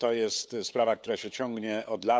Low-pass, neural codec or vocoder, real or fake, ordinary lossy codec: none; codec, 16 kHz, 8 kbps, FunCodec, trained on LibriTTS, 25 frames a second; fake; none